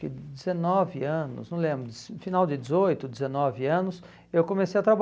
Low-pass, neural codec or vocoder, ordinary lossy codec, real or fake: none; none; none; real